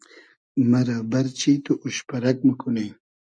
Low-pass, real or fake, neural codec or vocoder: 9.9 kHz; real; none